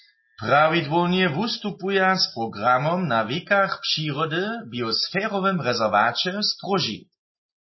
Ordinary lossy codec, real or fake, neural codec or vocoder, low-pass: MP3, 24 kbps; real; none; 7.2 kHz